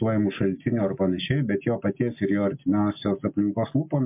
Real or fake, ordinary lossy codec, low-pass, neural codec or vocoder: real; MP3, 32 kbps; 3.6 kHz; none